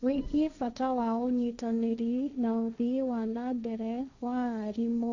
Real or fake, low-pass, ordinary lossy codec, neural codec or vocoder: fake; 7.2 kHz; none; codec, 16 kHz, 1.1 kbps, Voila-Tokenizer